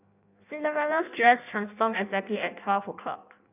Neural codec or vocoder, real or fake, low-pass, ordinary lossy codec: codec, 16 kHz in and 24 kHz out, 0.6 kbps, FireRedTTS-2 codec; fake; 3.6 kHz; none